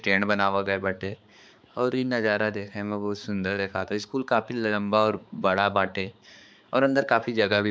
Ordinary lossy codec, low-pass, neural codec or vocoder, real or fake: none; none; codec, 16 kHz, 4 kbps, X-Codec, HuBERT features, trained on balanced general audio; fake